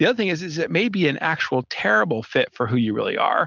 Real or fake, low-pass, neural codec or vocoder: real; 7.2 kHz; none